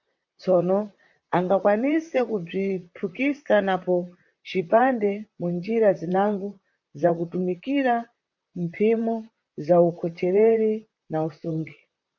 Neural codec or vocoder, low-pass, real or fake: vocoder, 44.1 kHz, 128 mel bands, Pupu-Vocoder; 7.2 kHz; fake